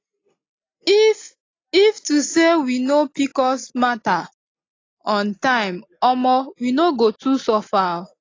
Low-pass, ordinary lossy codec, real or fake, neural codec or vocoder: 7.2 kHz; AAC, 32 kbps; real; none